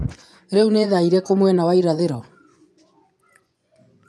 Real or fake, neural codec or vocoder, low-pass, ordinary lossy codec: fake; vocoder, 24 kHz, 100 mel bands, Vocos; none; none